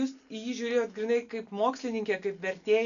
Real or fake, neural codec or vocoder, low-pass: real; none; 7.2 kHz